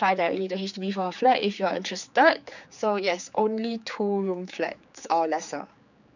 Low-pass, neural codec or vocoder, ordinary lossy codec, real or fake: 7.2 kHz; codec, 16 kHz, 4 kbps, X-Codec, HuBERT features, trained on general audio; none; fake